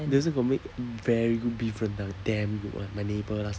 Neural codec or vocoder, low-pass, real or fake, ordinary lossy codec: none; none; real; none